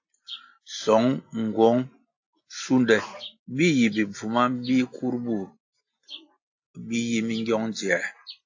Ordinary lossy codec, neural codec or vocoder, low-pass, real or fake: AAC, 48 kbps; none; 7.2 kHz; real